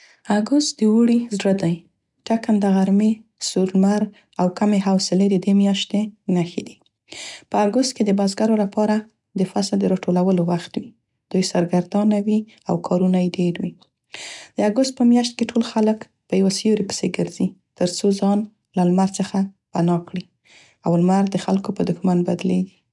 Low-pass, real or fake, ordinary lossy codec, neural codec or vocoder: 10.8 kHz; real; none; none